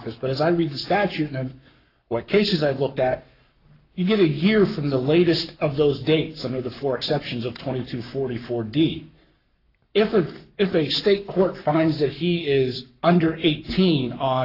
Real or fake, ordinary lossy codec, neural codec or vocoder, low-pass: fake; AAC, 32 kbps; codec, 44.1 kHz, 7.8 kbps, Pupu-Codec; 5.4 kHz